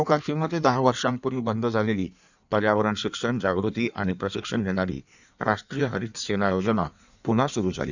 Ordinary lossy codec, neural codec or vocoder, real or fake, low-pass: none; codec, 16 kHz in and 24 kHz out, 1.1 kbps, FireRedTTS-2 codec; fake; 7.2 kHz